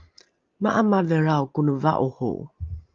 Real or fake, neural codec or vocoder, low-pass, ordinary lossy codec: real; none; 7.2 kHz; Opus, 32 kbps